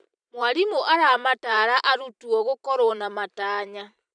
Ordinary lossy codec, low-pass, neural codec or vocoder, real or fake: none; 9.9 kHz; vocoder, 44.1 kHz, 128 mel bands every 512 samples, BigVGAN v2; fake